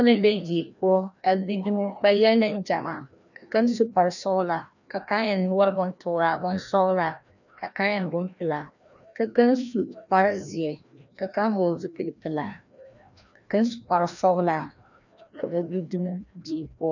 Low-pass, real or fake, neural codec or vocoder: 7.2 kHz; fake; codec, 16 kHz, 1 kbps, FreqCodec, larger model